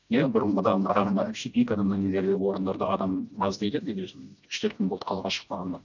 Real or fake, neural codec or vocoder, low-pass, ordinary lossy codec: fake; codec, 16 kHz, 1 kbps, FreqCodec, smaller model; 7.2 kHz; none